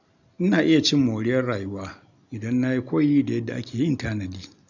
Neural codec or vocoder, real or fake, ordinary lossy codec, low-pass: none; real; none; 7.2 kHz